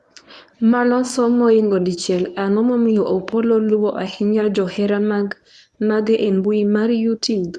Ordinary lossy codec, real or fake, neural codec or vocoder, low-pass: none; fake; codec, 24 kHz, 0.9 kbps, WavTokenizer, medium speech release version 1; none